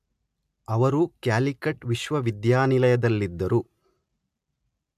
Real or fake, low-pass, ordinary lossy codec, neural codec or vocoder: real; 14.4 kHz; MP3, 96 kbps; none